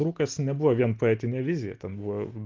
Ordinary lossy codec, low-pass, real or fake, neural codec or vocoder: Opus, 32 kbps; 7.2 kHz; real; none